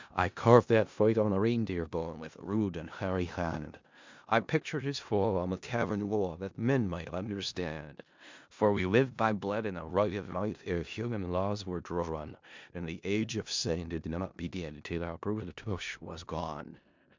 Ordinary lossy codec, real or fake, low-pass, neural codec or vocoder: MP3, 64 kbps; fake; 7.2 kHz; codec, 16 kHz in and 24 kHz out, 0.4 kbps, LongCat-Audio-Codec, four codebook decoder